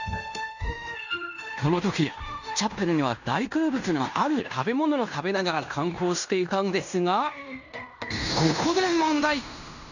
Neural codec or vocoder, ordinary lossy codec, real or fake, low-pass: codec, 16 kHz in and 24 kHz out, 0.9 kbps, LongCat-Audio-Codec, fine tuned four codebook decoder; none; fake; 7.2 kHz